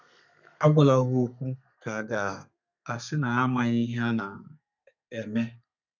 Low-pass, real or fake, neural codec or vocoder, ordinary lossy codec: 7.2 kHz; fake; codec, 32 kHz, 1.9 kbps, SNAC; none